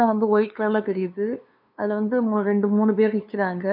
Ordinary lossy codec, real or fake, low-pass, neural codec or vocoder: none; fake; 5.4 kHz; codec, 16 kHz, 2 kbps, FunCodec, trained on LibriTTS, 25 frames a second